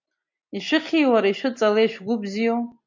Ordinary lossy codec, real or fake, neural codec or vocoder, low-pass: MP3, 64 kbps; real; none; 7.2 kHz